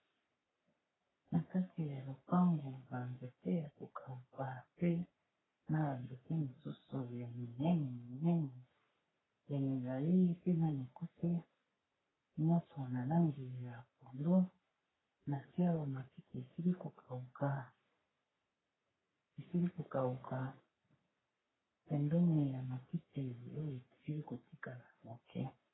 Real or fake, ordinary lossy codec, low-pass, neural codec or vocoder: fake; AAC, 16 kbps; 7.2 kHz; codec, 44.1 kHz, 3.4 kbps, Pupu-Codec